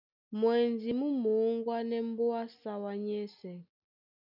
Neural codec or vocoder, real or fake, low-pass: none; real; 5.4 kHz